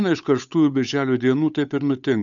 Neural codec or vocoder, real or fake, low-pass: codec, 16 kHz, 8 kbps, FunCodec, trained on Chinese and English, 25 frames a second; fake; 7.2 kHz